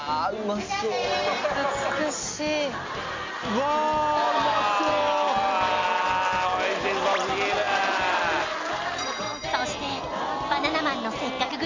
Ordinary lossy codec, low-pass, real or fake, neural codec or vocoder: MP3, 48 kbps; 7.2 kHz; real; none